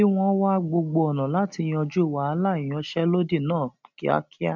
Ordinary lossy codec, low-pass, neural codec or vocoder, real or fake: none; 7.2 kHz; none; real